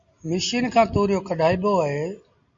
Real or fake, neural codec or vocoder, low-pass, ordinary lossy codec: real; none; 7.2 kHz; MP3, 48 kbps